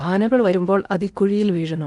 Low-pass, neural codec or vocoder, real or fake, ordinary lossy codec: 10.8 kHz; codec, 16 kHz in and 24 kHz out, 0.8 kbps, FocalCodec, streaming, 65536 codes; fake; none